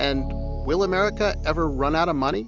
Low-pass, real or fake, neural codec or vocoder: 7.2 kHz; real; none